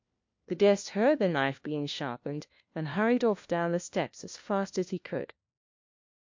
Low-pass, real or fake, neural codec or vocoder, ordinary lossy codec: 7.2 kHz; fake; codec, 16 kHz, 1 kbps, FunCodec, trained on LibriTTS, 50 frames a second; MP3, 48 kbps